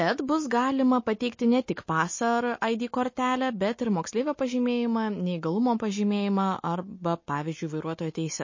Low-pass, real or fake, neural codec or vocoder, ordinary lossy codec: 7.2 kHz; real; none; MP3, 32 kbps